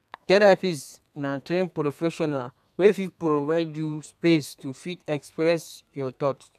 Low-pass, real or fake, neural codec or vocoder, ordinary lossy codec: 14.4 kHz; fake; codec, 32 kHz, 1.9 kbps, SNAC; none